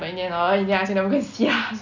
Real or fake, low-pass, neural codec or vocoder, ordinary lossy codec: real; 7.2 kHz; none; none